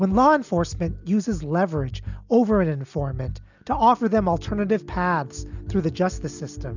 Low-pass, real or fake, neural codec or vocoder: 7.2 kHz; real; none